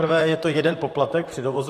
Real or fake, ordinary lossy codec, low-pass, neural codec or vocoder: fake; AAC, 64 kbps; 14.4 kHz; vocoder, 44.1 kHz, 128 mel bands, Pupu-Vocoder